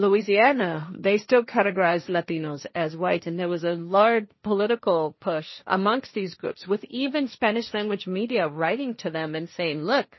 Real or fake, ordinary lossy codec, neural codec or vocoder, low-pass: fake; MP3, 24 kbps; codec, 16 kHz, 1.1 kbps, Voila-Tokenizer; 7.2 kHz